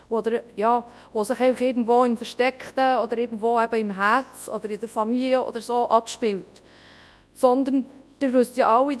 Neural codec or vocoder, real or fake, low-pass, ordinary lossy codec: codec, 24 kHz, 0.9 kbps, WavTokenizer, large speech release; fake; none; none